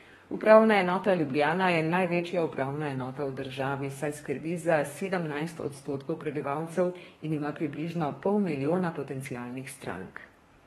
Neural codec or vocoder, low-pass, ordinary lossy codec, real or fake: codec, 32 kHz, 1.9 kbps, SNAC; 14.4 kHz; AAC, 32 kbps; fake